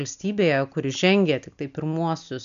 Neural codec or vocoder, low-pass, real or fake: none; 7.2 kHz; real